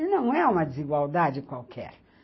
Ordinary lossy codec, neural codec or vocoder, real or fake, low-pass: MP3, 24 kbps; none; real; 7.2 kHz